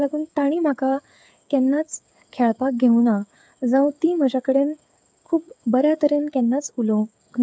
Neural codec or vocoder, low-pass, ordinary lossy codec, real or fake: codec, 16 kHz, 8 kbps, FreqCodec, smaller model; none; none; fake